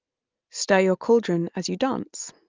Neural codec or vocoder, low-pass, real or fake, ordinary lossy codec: none; 7.2 kHz; real; Opus, 24 kbps